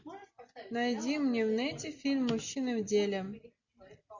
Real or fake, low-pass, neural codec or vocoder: real; 7.2 kHz; none